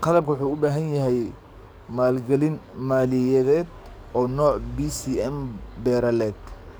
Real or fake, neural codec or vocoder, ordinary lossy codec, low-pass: fake; codec, 44.1 kHz, 7.8 kbps, DAC; none; none